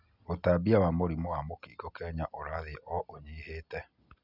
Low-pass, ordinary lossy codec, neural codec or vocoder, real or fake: 5.4 kHz; none; none; real